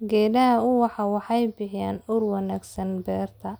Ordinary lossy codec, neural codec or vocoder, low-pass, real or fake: none; none; none; real